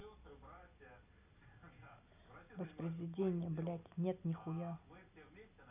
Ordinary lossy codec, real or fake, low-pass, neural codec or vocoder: none; real; 3.6 kHz; none